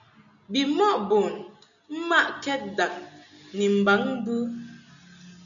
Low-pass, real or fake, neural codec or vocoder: 7.2 kHz; real; none